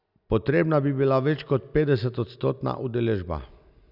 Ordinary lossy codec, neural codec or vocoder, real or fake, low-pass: none; none; real; 5.4 kHz